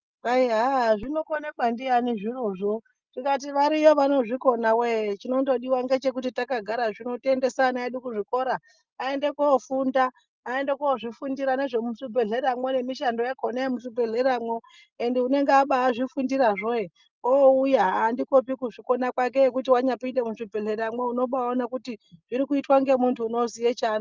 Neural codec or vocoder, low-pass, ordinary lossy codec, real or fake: none; 7.2 kHz; Opus, 24 kbps; real